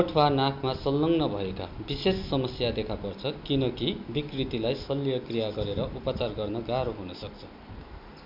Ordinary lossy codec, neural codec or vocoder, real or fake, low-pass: MP3, 48 kbps; none; real; 5.4 kHz